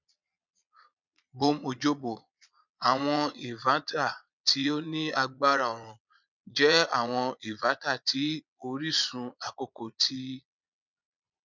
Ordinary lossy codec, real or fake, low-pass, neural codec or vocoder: none; fake; 7.2 kHz; vocoder, 22.05 kHz, 80 mel bands, Vocos